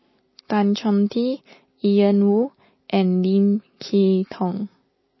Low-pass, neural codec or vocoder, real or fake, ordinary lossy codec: 7.2 kHz; none; real; MP3, 24 kbps